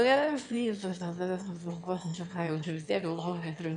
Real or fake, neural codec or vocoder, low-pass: fake; autoencoder, 22.05 kHz, a latent of 192 numbers a frame, VITS, trained on one speaker; 9.9 kHz